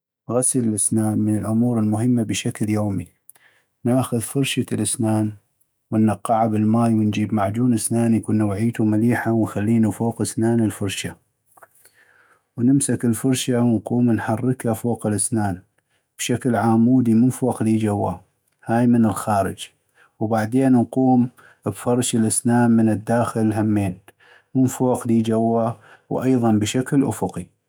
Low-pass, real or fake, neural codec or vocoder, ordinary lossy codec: none; fake; autoencoder, 48 kHz, 128 numbers a frame, DAC-VAE, trained on Japanese speech; none